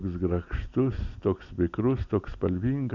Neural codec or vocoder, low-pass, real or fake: none; 7.2 kHz; real